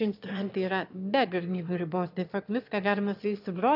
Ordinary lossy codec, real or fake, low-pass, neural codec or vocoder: AAC, 48 kbps; fake; 5.4 kHz; autoencoder, 22.05 kHz, a latent of 192 numbers a frame, VITS, trained on one speaker